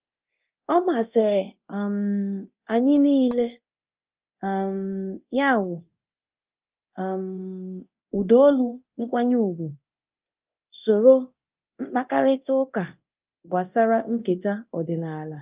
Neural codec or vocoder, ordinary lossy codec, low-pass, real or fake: codec, 24 kHz, 0.9 kbps, DualCodec; Opus, 24 kbps; 3.6 kHz; fake